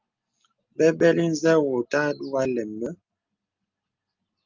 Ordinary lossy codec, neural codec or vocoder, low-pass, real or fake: Opus, 32 kbps; none; 7.2 kHz; real